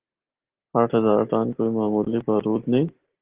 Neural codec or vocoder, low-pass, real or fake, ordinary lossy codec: none; 3.6 kHz; real; Opus, 16 kbps